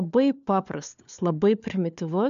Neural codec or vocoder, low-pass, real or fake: codec, 16 kHz, 4 kbps, FunCodec, trained on LibriTTS, 50 frames a second; 7.2 kHz; fake